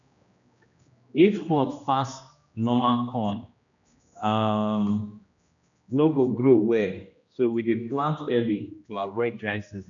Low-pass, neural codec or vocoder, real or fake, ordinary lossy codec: 7.2 kHz; codec, 16 kHz, 1 kbps, X-Codec, HuBERT features, trained on general audio; fake; none